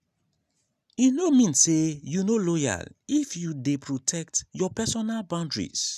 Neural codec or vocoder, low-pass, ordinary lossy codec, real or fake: none; 14.4 kHz; none; real